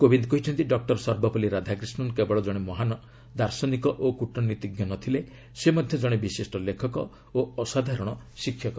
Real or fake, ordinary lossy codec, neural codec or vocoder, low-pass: real; none; none; none